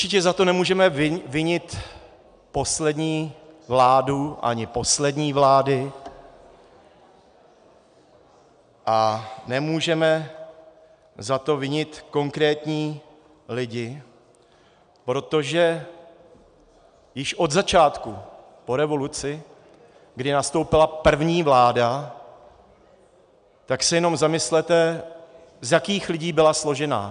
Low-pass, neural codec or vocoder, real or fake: 9.9 kHz; none; real